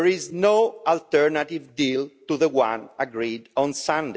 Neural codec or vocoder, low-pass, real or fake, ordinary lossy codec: none; none; real; none